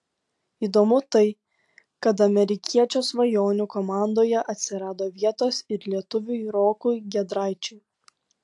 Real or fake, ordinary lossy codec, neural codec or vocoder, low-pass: real; AAC, 64 kbps; none; 10.8 kHz